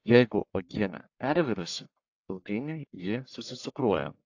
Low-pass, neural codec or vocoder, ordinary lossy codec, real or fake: 7.2 kHz; codec, 44.1 kHz, 1.7 kbps, Pupu-Codec; AAC, 48 kbps; fake